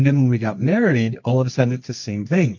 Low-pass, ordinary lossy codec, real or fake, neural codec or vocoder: 7.2 kHz; MP3, 48 kbps; fake; codec, 24 kHz, 0.9 kbps, WavTokenizer, medium music audio release